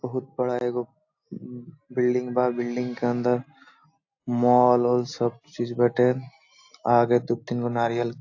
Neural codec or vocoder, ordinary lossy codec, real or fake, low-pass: none; AAC, 48 kbps; real; 7.2 kHz